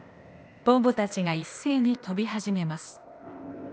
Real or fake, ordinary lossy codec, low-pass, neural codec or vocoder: fake; none; none; codec, 16 kHz, 0.8 kbps, ZipCodec